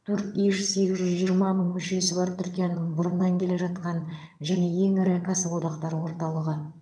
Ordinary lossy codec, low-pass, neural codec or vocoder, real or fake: none; none; vocoder, 22.05 kHz, 80 mel bands, HiFi-GAN; fake